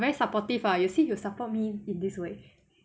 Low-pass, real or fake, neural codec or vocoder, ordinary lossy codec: none; real; none; none